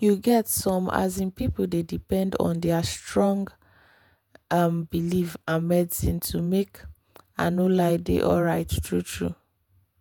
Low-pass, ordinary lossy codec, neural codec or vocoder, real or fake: none; none; vocoder, 48 kHz, 128 mel bands, Vocos; fake